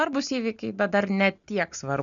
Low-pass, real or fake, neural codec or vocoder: 7.2 kHz; real; none